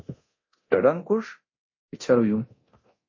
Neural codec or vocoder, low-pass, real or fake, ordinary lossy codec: codec, 24 kHz, 0.9 kbps, DualCodec; 7.2 kHz; fake; MP3, 32 kbps